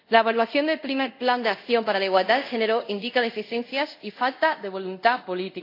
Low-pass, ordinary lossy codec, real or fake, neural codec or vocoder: 5.4 kHz; AAC, 32 kbps; fake; codec, 24 kHz, 0.5 kbps, DualCodec